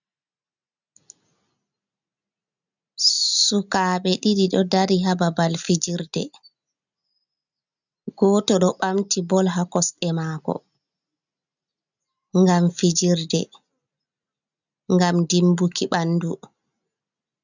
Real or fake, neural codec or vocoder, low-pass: real; none; 7.2 kHz